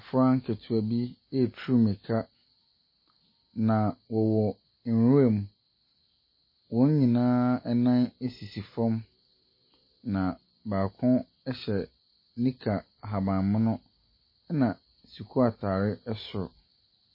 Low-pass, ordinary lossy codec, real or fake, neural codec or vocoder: 5.4 kHz; MP3, 24 kbps; real; none